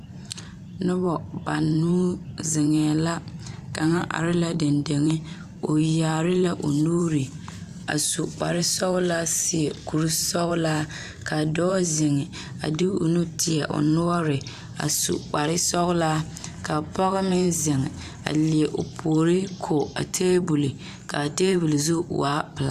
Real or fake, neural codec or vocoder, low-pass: fake; vocoder, 44.1 kHz, 128 mel bands every 512 samples, BigVGAN v2; 14.4 kHz